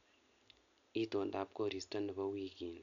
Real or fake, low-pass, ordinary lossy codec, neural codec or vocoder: real; 7.2 kHz; none; none